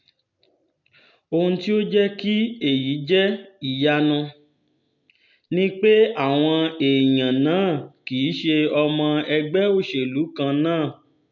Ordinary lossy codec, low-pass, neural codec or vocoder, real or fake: none; 7.2 kHz; none; real